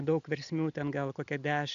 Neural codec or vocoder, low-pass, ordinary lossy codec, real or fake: none; 7.2 kHz; AAC, 96 kbps; real